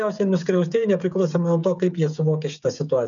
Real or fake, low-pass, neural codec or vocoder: fake; 7.2 kHz; codec, 16 kHz, 8 kbps, FreqCodec, smaller model